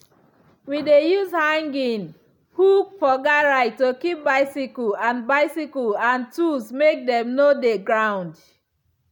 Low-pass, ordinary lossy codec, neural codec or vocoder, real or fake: 19.8 kHz; none; none; real